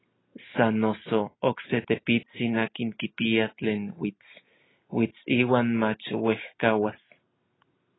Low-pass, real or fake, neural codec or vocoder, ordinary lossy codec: 7.2 kHz; real; none; AAC, 16 kbps